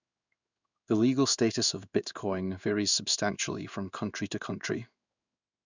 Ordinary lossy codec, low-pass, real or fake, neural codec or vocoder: none; 7.2 kHz; fake; codec, 16 kHz in and 24 kHz out, 1 kbps, XY-Tokenizer